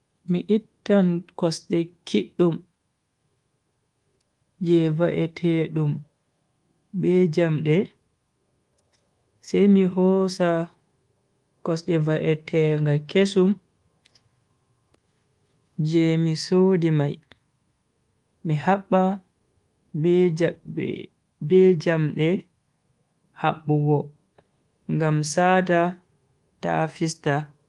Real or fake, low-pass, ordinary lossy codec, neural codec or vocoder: fake; 10.8 kHz; Opus, 24 kbps; codec, 24 kHz, 1.2 kbps, DualCodec